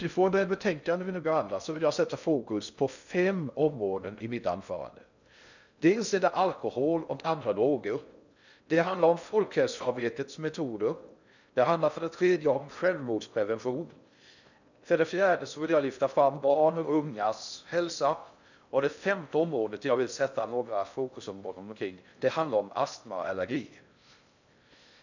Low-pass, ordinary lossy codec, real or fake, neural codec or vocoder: 7.2 kHz; none; fake; codec, 16 kHz in and 24 kHz out, 0.6 kbps, FocalCodec, streaming, 2048 codes